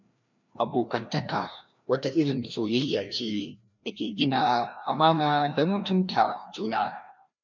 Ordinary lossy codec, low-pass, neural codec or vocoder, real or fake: MP3, 64 kbps; 7.2 kHz; codec, 16 kHz, 1 kbps, FreqCodec, larger model; fake